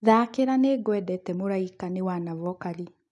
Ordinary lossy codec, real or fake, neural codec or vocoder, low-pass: none; real; none; 10.8 kHz